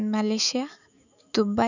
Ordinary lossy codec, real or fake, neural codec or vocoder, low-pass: none; fake; autoencoder, 48 kHz, 128 numbers a frame, DAC-VAE, trained on Japanese speech; 7.2 kHz